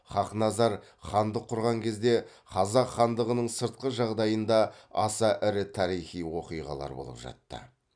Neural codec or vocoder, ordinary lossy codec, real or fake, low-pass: none; none; real; 9.9 kHz